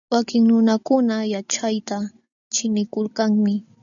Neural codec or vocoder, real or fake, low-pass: none; real; 7.2 kHz